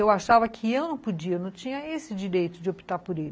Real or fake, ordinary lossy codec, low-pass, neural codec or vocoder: real; none; none; none